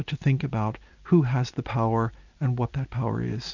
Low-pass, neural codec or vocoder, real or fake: 7.2 kHz; none; real